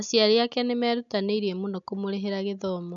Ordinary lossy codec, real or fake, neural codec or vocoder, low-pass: none; real; none; 7.2 kHz